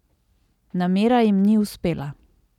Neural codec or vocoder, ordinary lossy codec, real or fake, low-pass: none; none; real; 19.8 kHz